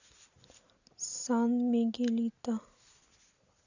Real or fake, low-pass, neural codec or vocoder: real; 7.2 kHz; none